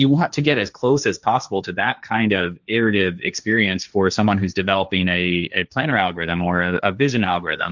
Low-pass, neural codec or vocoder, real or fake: 7.2 kHz; codec, 16 kHz, 1.1 kbps, Voila-Tokenizer; fake